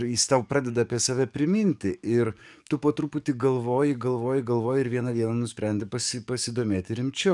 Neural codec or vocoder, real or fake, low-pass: codec, 44.1 kHz, 7.8 kbps, DAC; fake; 10.8 kHz